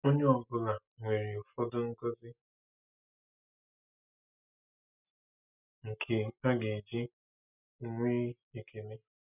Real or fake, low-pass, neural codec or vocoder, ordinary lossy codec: real; 3.6 kHz; none; none